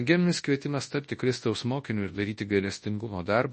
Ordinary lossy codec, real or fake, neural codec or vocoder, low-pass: MP3, 32 kbps; fake; codec, 24 kHz, 0.9 kbps, WavTokenizer, large speech release; 10.8 kHz